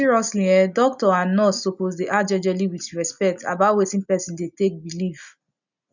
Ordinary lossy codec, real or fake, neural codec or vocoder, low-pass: none; real; none; 7.2 kHz